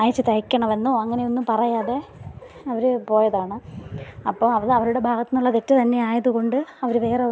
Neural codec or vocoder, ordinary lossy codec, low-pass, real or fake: none; none; none; real